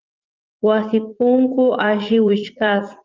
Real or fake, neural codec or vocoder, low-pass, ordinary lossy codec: fake; vocoder, 44.1 kHz, 80 mel bands, Vocos; 7.2 kHz; Opus, 32 kbps